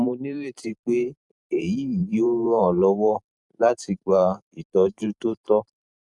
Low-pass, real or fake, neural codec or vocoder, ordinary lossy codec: 10.8 kHz; fake; vocoder, 44.1 kHz, 128 mel bands every 512 samples, BigVGAN v2; none